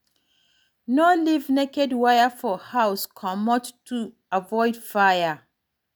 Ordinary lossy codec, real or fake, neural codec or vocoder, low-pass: none; real; none; none